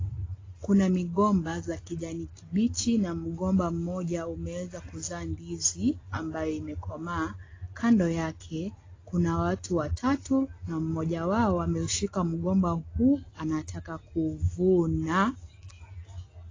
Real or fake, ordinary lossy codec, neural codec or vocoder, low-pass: real; AAC, 32 kbps; none; 7.2 kHz